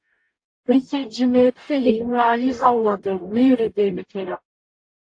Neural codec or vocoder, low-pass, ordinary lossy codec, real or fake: codec, 44.1 kHz, 0.9 kbps, DAC; 9.9 kHz; AAC, 48 kbps; fake